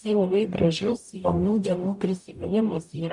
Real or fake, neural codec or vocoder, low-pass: fake; codec, 44.1 kHz, 0.9 kbps, DAC; 10.8 kHz